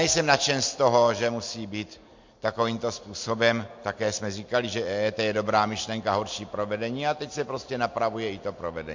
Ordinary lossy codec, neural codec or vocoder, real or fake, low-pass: MP3, 48 kbps; none; real; 7.2 kHz